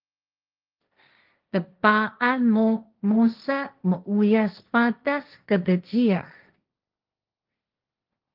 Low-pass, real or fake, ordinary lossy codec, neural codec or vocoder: 5.4 kHz; fake; Opus, 32 kbps; codec, 16 kHz, 1.1 kbps, Voila-Tokenizer